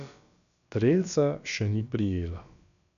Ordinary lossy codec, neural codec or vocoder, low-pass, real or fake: none; codec, 16 kHz, about 1 kbps, DyCAST, with the encoder's durations; 7.2 kHz; fake